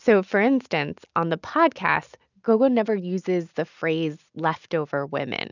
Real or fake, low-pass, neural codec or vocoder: real; 7.2 kHz; none